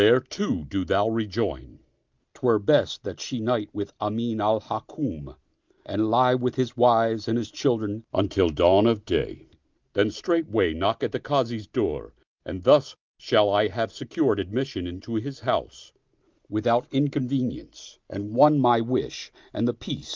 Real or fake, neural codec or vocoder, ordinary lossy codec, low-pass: real; none; Opus, 24 kbps; 7.2 kHz